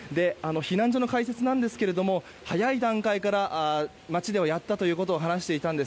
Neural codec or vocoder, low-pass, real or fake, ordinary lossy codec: none; none; real; none